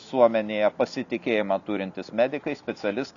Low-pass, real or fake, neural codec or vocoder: 7.2 kHz; real; none